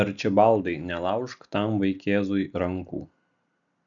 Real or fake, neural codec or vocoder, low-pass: real; none; 7.2 kHz